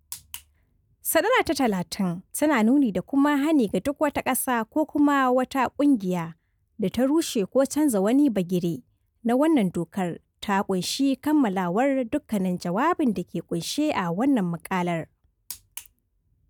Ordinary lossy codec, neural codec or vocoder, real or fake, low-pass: none; none; real; 19.8 kHz